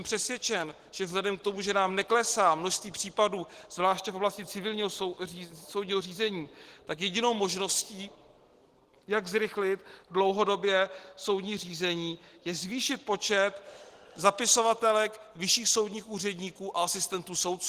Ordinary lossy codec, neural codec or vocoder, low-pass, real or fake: Opus, 16 kbps; none; 14.4 kHz; real